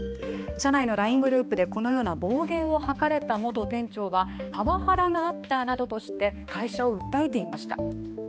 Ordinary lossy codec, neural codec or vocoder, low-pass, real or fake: none; codec, 16 kHz, 2 kbps, X-Codec, HuBERT features, trained on balanced general audio; none; fake